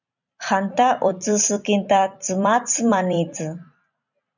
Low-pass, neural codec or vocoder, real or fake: 7.2 kHz; vocoder, 44.1 kHz, 128 mel bands every 256 samples, BigVGAN v2; fake